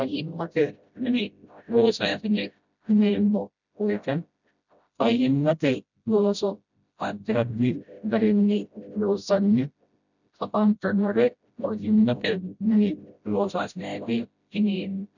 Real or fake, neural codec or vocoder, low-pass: fake; codec, 16 kHz, 0.5 kbps, FreqCodec, smaller model; 7.2 kHz